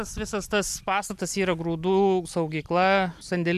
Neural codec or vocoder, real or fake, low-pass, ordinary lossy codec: none; real; 14.4 kHz; AAC, 96 kbps